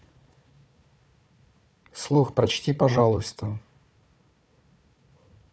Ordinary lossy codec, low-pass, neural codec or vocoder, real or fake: none; none; codec, 16 kHz, 4 kbps, FunCodec, trained on Chinese and English, 50 frames a second; fake